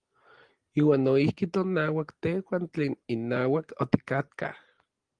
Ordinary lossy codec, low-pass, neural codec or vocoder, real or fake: Opus, 24 kbps; 9.9 kHz; none; real